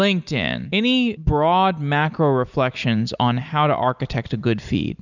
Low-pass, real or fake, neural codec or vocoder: 7.2 kHz; real; none